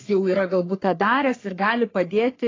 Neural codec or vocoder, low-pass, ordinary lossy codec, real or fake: codec, 44.1 kHz, 3.4 kbps, Pupu-Codec; 7.2 kHz; AAC, 32 kbps; fake